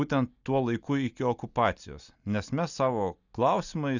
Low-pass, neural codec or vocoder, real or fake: 7.2 kHz; none; real